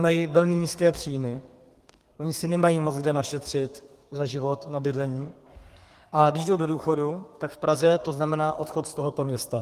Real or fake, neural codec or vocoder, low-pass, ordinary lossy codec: fake; codec, 32 kHz, 1.9 kbps, SNAC; 14.4 kHz; Opus, 24 kbps